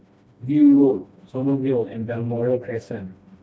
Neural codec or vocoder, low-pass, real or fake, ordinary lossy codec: codec, 16 kHz, 1 kbps, FreqCodec, smaller model; none; fake; none